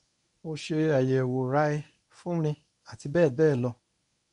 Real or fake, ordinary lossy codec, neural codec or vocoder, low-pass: fake; none; codec, 24 kHz, 0.9 kbps, WavTokenizer, medium speech release version 1; 10.8 kHz